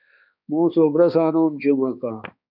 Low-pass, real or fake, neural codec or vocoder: 5.4 kHz; fake; codec, 16 kHz, 2 kbps, X-Codec, HuBERT features, trained on balanced general audio